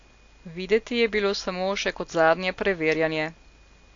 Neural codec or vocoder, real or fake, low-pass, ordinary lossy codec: none; real; 7.2 kHz; AAC, 48 kbps